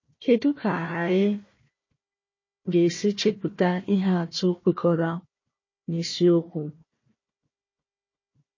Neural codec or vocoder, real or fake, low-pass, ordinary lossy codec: codec, 16 kHz, 1 kbps, FunCodec, trained on Chinese and English, 50 frames a second; fake; 7.2 kHz; MP3, 32 kbps